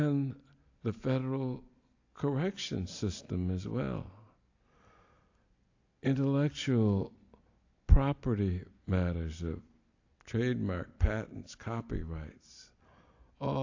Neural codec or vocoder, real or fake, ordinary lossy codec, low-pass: none; real; AAC, 48 kbps; 7.2 kHz